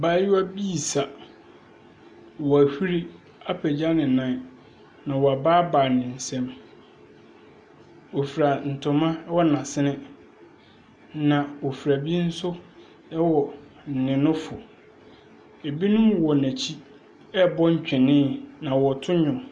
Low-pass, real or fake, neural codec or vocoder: 9.9 kHz; real; none